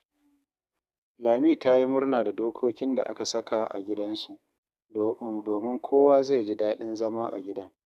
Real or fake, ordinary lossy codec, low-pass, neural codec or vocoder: fake; none; 14.4 kHz; codec, 32 kHz, 1.9 kbps, SNAC